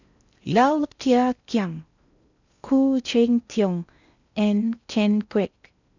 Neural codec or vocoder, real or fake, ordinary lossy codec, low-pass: codec, 16 kHz in and 24 kHz out, 0.6 kbps, FocalCodec, streaming, 4096 codes; fake; none; 7.2 kHz